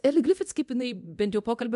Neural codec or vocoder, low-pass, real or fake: codec, 24 kHz, 0.9 kbps, DualCodec; 10.8 kHz; fake